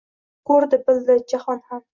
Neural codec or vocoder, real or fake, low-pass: none; real; 7.2 kHz